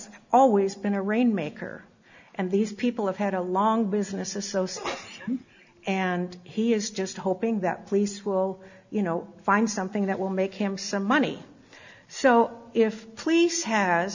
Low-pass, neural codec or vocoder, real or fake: 7.2 kHz; none; real